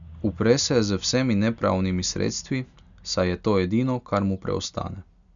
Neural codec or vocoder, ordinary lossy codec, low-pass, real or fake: none; none; 7.2 kHz; real